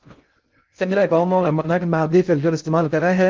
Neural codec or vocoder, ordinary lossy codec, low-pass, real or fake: codec, 16 kHz in and 24 kHz out, 0.6 kbps, FocalCodec, streaming, 4096 codes; Opus, 24 kbps; 7.2 kHz; fake